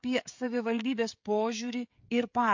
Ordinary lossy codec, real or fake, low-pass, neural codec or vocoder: MP3, 48 kbps; fake; 7.2 kHz; codec, 16 kHz, 16 kbps, FreqCodec, smaller model